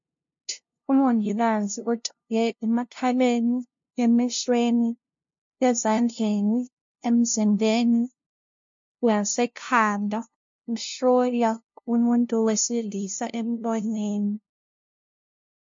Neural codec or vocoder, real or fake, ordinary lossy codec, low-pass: codec, 16 kHz, 0.5 kbps, FunCodec, trained on LibriTTS, 25 frames a second; fake; MP3, 48 kbps; 7.2 kHz